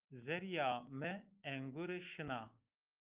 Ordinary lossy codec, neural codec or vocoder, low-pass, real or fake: Opus, 24 kbps; autoencoder, 48 kHz, 128 numbers a frame, DAC-VAE, trained on Japanese speech; 3.6 kHz; fake